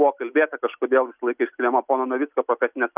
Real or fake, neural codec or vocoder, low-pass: real; none; 3.6 kHz